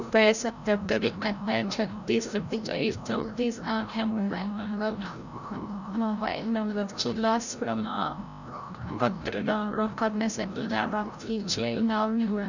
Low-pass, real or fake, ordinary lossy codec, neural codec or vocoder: 7.2 kHz; fake; none; codec, 16 kHz, 0.5 kbps, FreqCodec, larger model